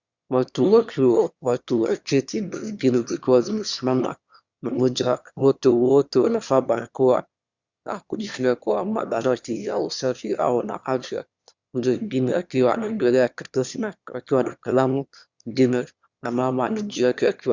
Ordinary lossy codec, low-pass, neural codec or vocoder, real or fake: Opus, 64 kbps; 7.2 kHz; autoencoder, 22.05 kHz, a latent of 192 numbers a frame, VITS, trained on one speaker; fake